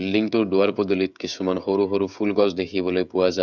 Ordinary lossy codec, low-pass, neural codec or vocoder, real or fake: none; 7.2 kHz; codec, 16 kHz, 16 kbps, FreqCodec, smaller model; fake